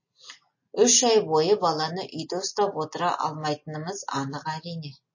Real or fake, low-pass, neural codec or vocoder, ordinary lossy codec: real; 7.2 kHz; none; MP3, 32 kbps